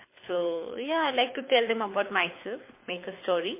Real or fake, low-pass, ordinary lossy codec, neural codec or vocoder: fake; 3.6 kHz; MP3, 24 kbps; codec, 24 kHz, 6 kbps, HILCodec